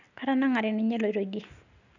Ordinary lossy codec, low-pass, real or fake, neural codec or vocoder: none; 7.2 kHz; real; none